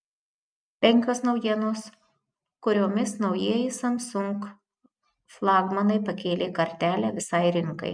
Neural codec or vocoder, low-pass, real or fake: none; 9.9 kHz; real